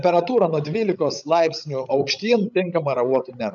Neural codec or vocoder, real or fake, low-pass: codec, 16 kHz, 16 kbps, FreqCodec, larger model; fake; 7.2 kHz